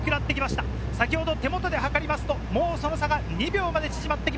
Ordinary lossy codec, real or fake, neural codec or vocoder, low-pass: none; real; none; none